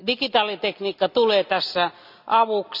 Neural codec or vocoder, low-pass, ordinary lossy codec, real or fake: none; 5.4 kHz; none; real